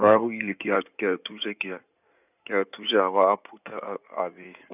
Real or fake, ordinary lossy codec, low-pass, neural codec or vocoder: fake; none; 3.6 kHz; codec, 16 kHz in and 24 kHz out, 2.2 kbps, FireRedTTS-2 codec